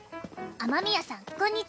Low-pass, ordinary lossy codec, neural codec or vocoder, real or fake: none; none; none; real